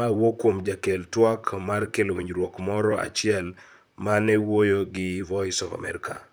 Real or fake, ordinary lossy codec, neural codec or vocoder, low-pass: fake; none; vocoder, 44.1 kHz, 128 mel bands, Pupu-Vocoder; none